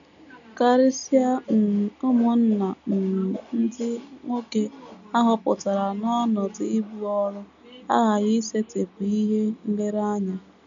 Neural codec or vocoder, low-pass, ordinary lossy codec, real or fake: none; 7.2 kHz; none; real